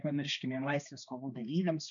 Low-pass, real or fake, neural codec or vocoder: 7.2 kHz; fake; codec, 16 kHz, 2 kbps, X-Codec, HuBERT features, trained on balanced general audio